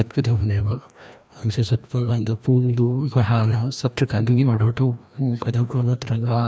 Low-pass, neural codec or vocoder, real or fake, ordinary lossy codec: none; codec, 16 kHz, 1 kbps, FreqCodec, larger model; fake; none